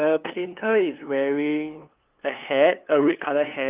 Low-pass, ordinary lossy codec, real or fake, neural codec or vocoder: 3.6 kHz; Opus, 32 kbps; fake; codec, 16 kHz, 2 kbps, FunCodec, trained on LibriTTS, 25 frames a second